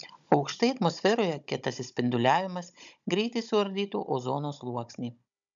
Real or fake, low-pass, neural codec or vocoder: fake; 7.2 kHz; codec, 16 kHz, 16 kbps, FunCodec, trained on Chinese and English, 50 frames a second